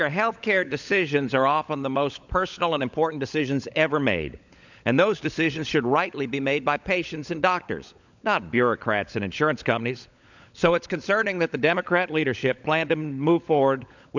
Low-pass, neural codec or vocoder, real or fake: 7.2 kHz; codec, 16 kHz, 16 kbps, FunCodec, trained on LibriTTS, 50 frames a second; fake